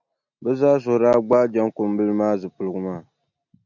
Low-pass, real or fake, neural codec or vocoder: 7.2 kHz; real; none